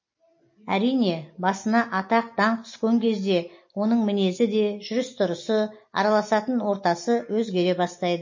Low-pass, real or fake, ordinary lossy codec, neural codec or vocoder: 7.2 kHz; real; MP3, 32 kbps; none